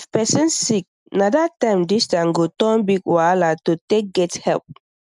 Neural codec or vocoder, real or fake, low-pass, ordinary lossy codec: none; real; 10.8 kHz; none